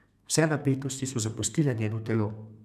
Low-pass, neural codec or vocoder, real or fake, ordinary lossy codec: 14.4 kHz; codec, 32 kHz, 1.9 kbps, SNAC; fake; none